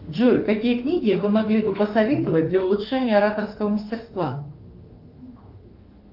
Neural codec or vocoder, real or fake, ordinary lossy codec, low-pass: autoencoder, 48 kHz, 32 numbers a frame, DAC-VAE, trained on Japanese speech; fake; Opus, 32 kbps; 5.4 kHz